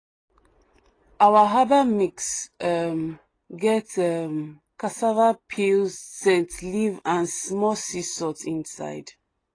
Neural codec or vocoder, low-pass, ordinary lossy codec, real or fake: none; 9.9 kHz; AAC, 32 kbps; real